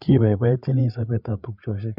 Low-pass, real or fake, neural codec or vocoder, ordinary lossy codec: 5.4 kHz; fake; codec, 16 kHz, 8 kbps, FreqCodec, larger model; none